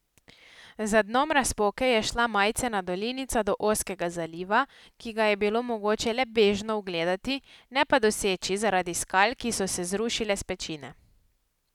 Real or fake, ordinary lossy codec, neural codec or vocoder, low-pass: real; none; none; 19.8 kHz